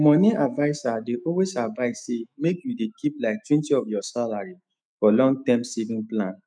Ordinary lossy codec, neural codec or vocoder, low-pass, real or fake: none; autoencoder, 48 kHz, 128 numbers a frame, DAC-VAE, trained on Japanese speech; 9.9 kHz; fake